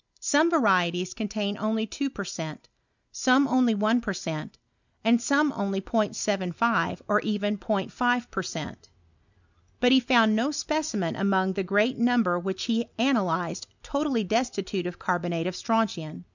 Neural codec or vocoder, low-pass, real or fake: none; 7.2 kHz; real